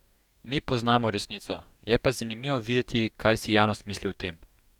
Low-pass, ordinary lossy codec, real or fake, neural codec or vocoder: 19.8 kHz; none; fake; codec, 44.1 kHz, 2.6 kbps, DAC